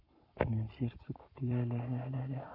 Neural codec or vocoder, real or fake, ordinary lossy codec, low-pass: codec, 44.1 kHz, 7.8 kbps, Pupu-Codec; fake; none; 5.4 kHz